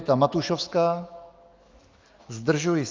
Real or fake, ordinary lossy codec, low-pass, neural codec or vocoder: fake; Opus, 24 kbps; 7.2 kHz; autoencoder, 48 kHz, 128 numbers a frame, DAC-VAE, trained on Japanese speech